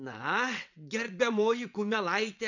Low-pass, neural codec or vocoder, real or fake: 7.2 kHz; vocoder, 22.05 kHz, 80 mel bands, WaveNeXt; fake